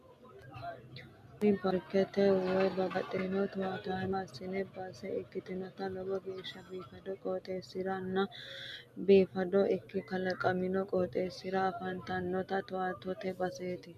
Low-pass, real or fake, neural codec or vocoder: 14.4 kHz; real; none